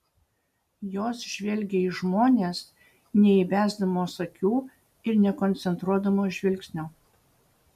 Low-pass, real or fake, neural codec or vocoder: 14.4 kHz; real; none